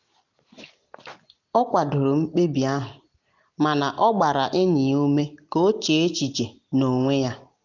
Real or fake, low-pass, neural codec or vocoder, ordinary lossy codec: real; 7.2 kHz; none; none